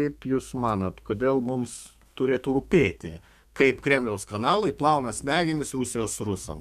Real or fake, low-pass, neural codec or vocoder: fake; 14.4 kHz; codec, 32 kHz, 1.9 kbps, SNAC